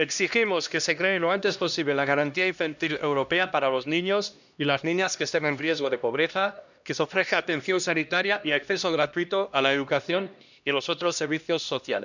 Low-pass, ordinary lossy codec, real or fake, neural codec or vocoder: 7.2 kHz; none; fake; codec, 16 kHz, 1 kbps, X-Codec, HuBERT features, trained on LibriSpeech